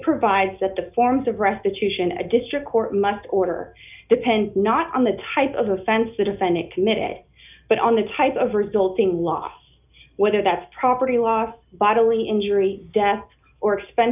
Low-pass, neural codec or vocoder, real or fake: 3.6 kHz; none; real